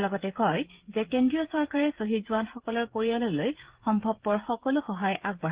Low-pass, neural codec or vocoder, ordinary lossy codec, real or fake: 3.6 kHz; codec, 16 kHz, 16 kbps, FreqCodec, smaller model; Opus, 16 kbps; fake